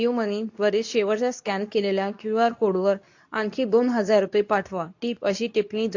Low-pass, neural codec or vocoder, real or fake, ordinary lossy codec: 7.2 kHz; codec, 24 kHz, 0.9 kbps, WavTokenizer, medium speech release version 2; fake; AAC, 48 kbps